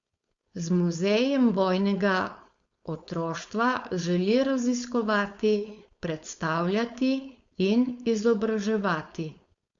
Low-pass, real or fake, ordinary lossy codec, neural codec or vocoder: 7.2 kHz; fake; Opus, 64 kbps; codec, 16 kHz, 4.8 kbps, FACodec